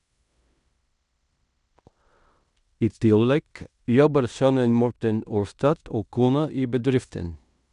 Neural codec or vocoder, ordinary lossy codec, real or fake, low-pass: codec, 16 kHz in and 24 kHz out, 0.9 kbps, LongCat-Audio-Codec, fine tuned four codebook decoder; none; fake; 10.8 kHz